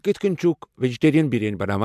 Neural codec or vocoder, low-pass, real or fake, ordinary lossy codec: none; 14.4 kHz; real; MP3, 96 kbps